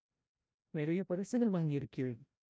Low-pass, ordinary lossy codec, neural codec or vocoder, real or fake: none; none; codec, 16 kHz, 0.5 kbps, FreqCodec, larger model; fake